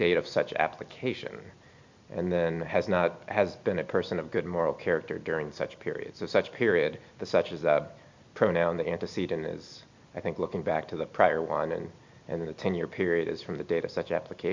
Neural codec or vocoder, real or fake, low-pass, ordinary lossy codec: none; real; 7.2 kHz; MP3, 64 kbps